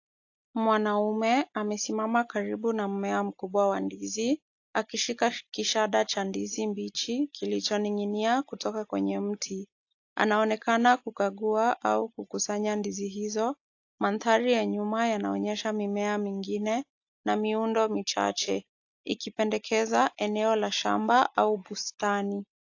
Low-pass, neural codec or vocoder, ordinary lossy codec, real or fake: 7.2 kHz; none; AAC, 48 kbps; real